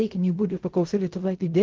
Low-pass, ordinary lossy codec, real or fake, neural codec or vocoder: 7.2 kHz; Opus, 16 kbps; fake; codec, 16 kHz in and 24 kHz out, 0.4 kbps, LongCat-Audio-Codec, fine tuned four codebook decoder